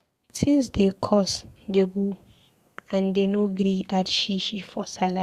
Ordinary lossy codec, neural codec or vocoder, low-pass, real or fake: Opus, 64 kbps; codec, 32 kHz, 1.9 kbps, SNAC; 14.4 kHz; fake